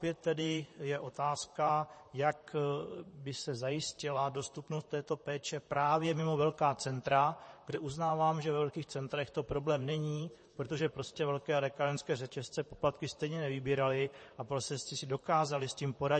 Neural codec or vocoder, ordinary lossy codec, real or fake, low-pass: vocoder, 44.1 kHz, 128 mel bands, Pupu-Vocoder; MP3, 32 kbps; fake; 10.8 kHz